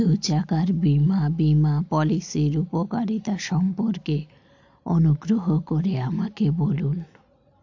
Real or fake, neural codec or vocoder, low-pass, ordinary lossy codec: real; none; 7.2 kHz; AAC, 48 kbps